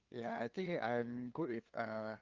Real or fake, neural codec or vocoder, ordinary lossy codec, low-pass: fake; codec, 16 kHz, 2 kbps, FunCodec, trained on LibriTTS, 25 frames a second; Opus, 32 kbps; 7.2 kHz